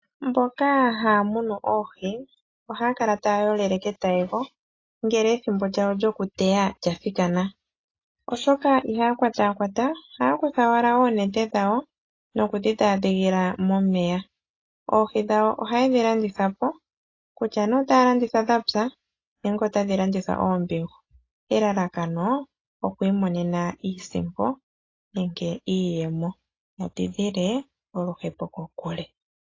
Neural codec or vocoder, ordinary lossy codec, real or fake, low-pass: none; AAC, 32 kbps; real; 7.2 kHz